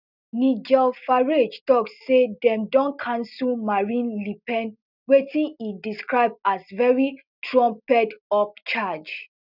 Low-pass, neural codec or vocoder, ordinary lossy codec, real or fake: 5.4 kHz; none; none; real